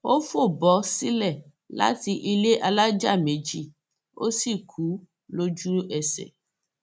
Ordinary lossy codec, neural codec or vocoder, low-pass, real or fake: none; none; none; real